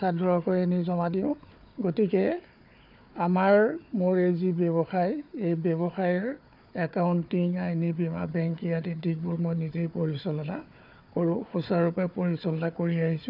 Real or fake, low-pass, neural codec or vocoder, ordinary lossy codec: fake; 5.4 kHz; codec, 16 kHz, 4 kbps, FunCodec, trained on Chinese and English, 50 frames a second; none